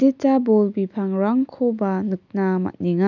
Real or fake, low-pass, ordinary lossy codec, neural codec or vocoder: real; 7.2 kHz; none; none